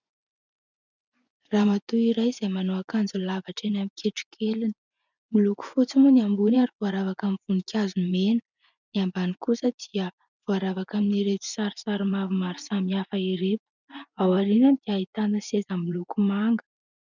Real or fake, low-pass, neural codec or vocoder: fake; 7.2 kHz; vocoder, 24 kHz, 100 mel bands, Vocos